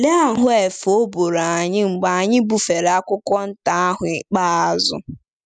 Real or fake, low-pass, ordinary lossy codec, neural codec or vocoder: real; 9.9 kHz; none; none